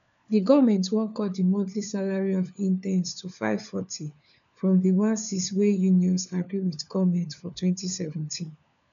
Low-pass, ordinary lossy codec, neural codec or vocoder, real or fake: 7.2 kHz; none; codec, 16 kHz, 4 kbps, FunCodec, trained on LibriTTS, 50 frames a second; fake